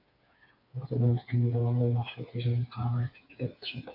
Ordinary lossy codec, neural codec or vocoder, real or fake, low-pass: AAC, 48 kbps; codec, 16 kHz, 4 kbps, FreqCodec, smaller model; fake; 5.4 kHz